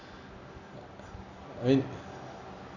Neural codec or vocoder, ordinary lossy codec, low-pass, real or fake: none; none; 7.2 kHz; real